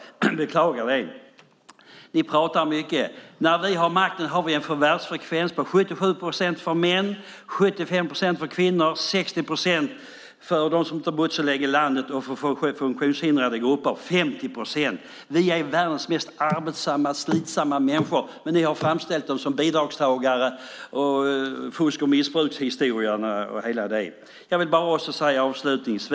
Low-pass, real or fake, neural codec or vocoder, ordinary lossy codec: none; real; none; none